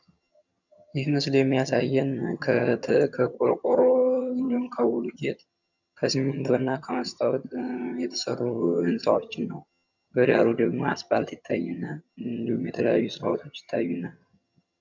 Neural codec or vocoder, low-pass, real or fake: vocoder, 22.05 kHz, 80 mel bands, HiFi-GAN; 7.2 kHz; fake